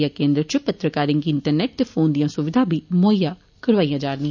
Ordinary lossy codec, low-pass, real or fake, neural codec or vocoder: none; 7.2 kHz; real; none